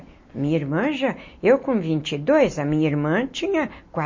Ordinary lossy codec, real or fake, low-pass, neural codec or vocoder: MP3, 32 kbps; real; 7.2 kHz; none